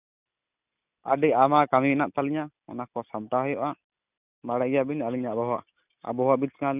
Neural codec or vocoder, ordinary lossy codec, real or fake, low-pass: none; none; real; 3.6 kHz